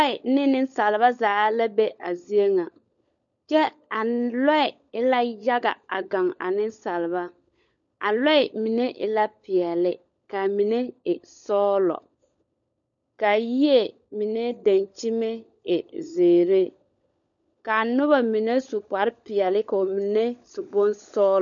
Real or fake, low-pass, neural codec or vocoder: fake; 7.2 kHz; codec, 16 kHz, 16 kbps, FunCodec, trained on LibriTTS, 50 frames a second